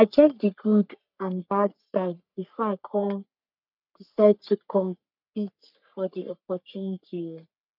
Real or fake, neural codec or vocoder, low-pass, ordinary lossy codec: fake; codec, 44.1 kHz, 3.4 kbps, Pupu-Codec; 5.4 kHz; none